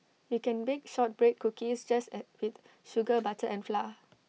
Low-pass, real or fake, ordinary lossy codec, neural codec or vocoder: none; real; none; none